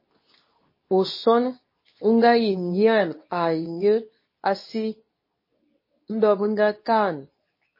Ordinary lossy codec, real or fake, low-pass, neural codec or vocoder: MP3, 24 kbps; fake; 5.4 kHz; codec, 24 kHz, 0.9 kbps, WavTokenizer, medium speech release version 2